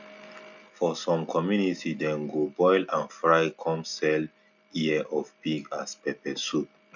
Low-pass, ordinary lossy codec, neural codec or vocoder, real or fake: 7.2 kHz; none; none; real